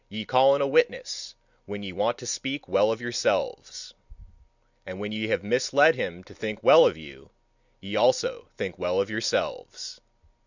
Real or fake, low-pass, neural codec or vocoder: real; 7.2 kHz; none